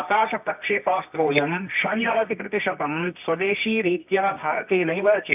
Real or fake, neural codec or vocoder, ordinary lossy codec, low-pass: fake; codec, 24 kHz, 0.9 kbps, WavTokenizer, medium music audio release; none; 3.6 kHz